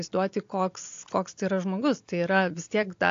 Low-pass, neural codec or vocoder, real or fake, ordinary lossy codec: 7.2 kHz; none; real; AAC, 48 kbps